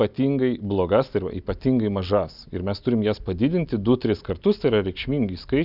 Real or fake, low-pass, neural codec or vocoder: real; 5.4 kHz; none